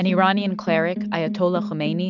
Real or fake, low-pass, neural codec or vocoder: real; 7.2 kHz; none